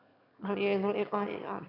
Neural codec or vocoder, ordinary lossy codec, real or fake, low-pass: autoencoder, 22.05 kHz, a latent of 192 numbers a frame, VITS, trained on one speaker; MP3, 48 kbps; fake; 5.4 kHz